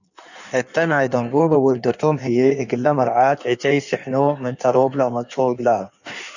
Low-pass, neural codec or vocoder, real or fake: 7.2 kHz; codec, 16 kHz in and 24 kHz out, 1.1 kbps, FireRedTTS-2 codec; fake